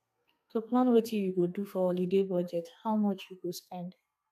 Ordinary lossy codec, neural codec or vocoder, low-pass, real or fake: none; codec, 32 kHz, 1.9 kbps, SNAC; 14.4 kHz; fake